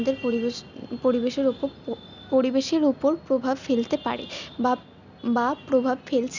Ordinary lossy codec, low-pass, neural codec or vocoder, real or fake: none; 7.2 kHz; none; real